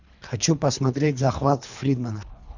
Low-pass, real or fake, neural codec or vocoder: 7.2 kHz; fake; codec, 24 kHz, 3 kbps, HILCodec